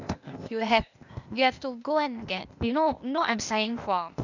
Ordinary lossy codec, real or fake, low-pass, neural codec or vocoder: none; fake; 7.2 kHz; codec, 16 kHz, 0.8 kbps, ZipCodec